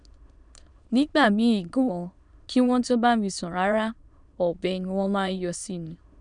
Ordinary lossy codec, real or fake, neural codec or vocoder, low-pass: none; fake; autoencoder, 22.05 kHz, a latent of 192 numbers a frame, VITS, trained on many speakers; 9.9 kHz